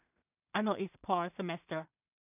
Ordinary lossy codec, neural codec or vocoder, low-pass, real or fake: none; codec, 16 kHz in and 24 kHz out, 0.4 kbps, LongCat-Audio-Codec, two codebook decoder; 3.6 kHz; fake